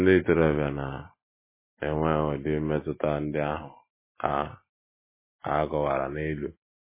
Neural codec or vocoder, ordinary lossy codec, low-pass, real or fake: none; MP3, 16 kbps; 3.6 kHz; real